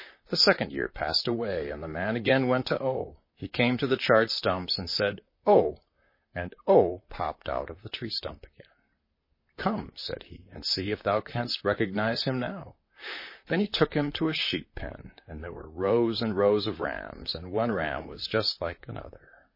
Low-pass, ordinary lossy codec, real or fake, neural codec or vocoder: 5.4 kHz; MP3, 24 kbps; fake; vocoder, 44.1 kHz, 128 mel bands, Pupu-Vocoder